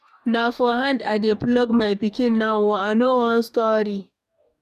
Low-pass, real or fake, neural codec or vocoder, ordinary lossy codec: 14.4 kHz; fake; codec, 44.1 kHz, 2.6 kbps, DAC; none